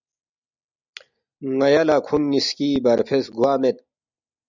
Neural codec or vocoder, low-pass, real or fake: none; 7.2 kHz; real